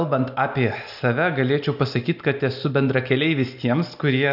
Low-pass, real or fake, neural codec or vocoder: 5.4 kHz; real; none